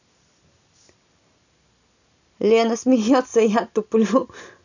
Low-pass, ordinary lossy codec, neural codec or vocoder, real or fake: 7.2 kHz; none; none; real